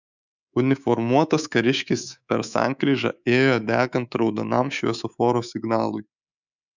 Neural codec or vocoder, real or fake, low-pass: codec, 24 kHz, 3.1 kbps, DualCodec; fake; 7.2 kHz